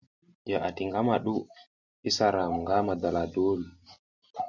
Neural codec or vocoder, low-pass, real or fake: none; 7.2 kHz; real